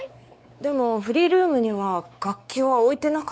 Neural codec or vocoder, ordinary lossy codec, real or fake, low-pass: codec, 16 kHz, 4 kbps, X-Codec, WavLM features, trained on Multilingual LibriSpeech; none; fake; none